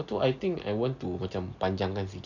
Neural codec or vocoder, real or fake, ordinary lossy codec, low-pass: none; real; none; 7.2 kHz